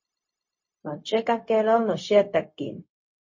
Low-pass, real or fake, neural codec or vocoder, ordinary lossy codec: 7.2 kHz; fake; codec, 16 kHz, 0.4 kbps, LongCat-Audio-Codec; MP3, 32 kbps